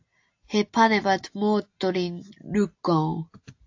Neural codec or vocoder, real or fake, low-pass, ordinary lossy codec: none; real; 7.2 kHz; AAC, 48 kbps